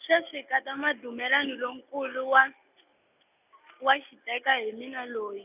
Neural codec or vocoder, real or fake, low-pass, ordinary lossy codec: none; real; 3.6 kHz; none